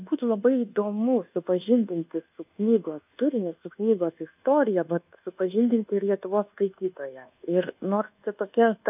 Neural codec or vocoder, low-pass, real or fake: codec, 24 kHz, 1.2 kbps, DualCodec; 3.6 kHz; fake